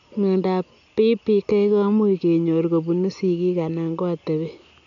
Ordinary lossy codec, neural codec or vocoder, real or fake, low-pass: none; none; real; 7.2 kHz